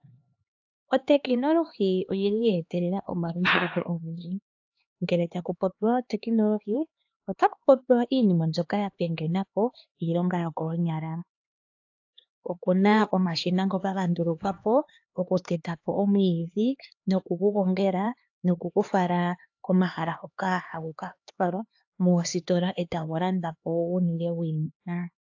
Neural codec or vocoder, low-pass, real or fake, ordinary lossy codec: codec, 16 kHz, 2 kbps, X-Codec, HuBERT features, trained on LibriSpeech; 7.2 kHz; fake; AAC, 48 kbps